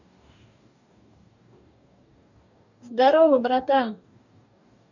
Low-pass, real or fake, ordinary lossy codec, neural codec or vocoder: 7.2 kHz; fake; none; codec, 44.1 kHz, 2.6 kbps, DAC